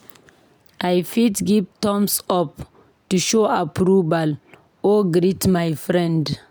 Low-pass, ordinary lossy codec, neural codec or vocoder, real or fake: none; none; none; real